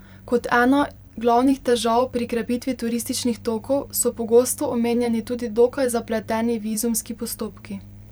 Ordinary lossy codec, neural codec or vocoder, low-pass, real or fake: none; vocoder, 44.1 kHz, 128 mel bands every 512 samples, BigVGAN v2; none; fake